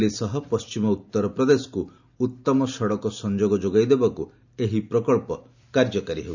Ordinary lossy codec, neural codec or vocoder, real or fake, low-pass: none; none; real; 7.2 kHz